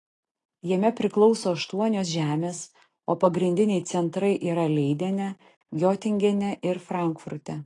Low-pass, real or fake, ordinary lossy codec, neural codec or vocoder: 10.8 kHz; real; AAC, 32 kbps; none